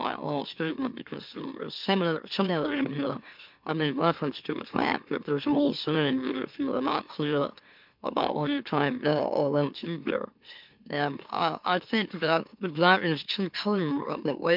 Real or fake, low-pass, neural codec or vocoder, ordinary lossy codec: fake; 5.4 kHz; autoencoder, 44.1 kHz, a latent of 192 numbers a frame, MeloTTS; MP3, 48 kbps